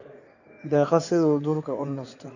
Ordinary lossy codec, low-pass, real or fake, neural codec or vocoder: none; 7.2 kHz; fake; codec, 16 kHz in and 24 kHz out, 2.2 kbps, FireRedTTS-2 codec